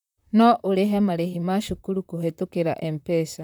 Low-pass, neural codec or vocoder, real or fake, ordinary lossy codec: 19.8 kHz; vocoder, 44.1 kHz, 128 mel bands, Pupu-Vocoder; fake; none